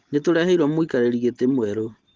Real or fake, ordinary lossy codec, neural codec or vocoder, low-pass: real; Opus, 16 kbps; none; 7.2 kHz